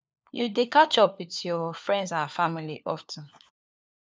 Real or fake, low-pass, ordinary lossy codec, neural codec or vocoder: fake; none; none; codec, 16 kHz, 4 kbps, FunCodec, trained on LibriTTS, 50 frames a second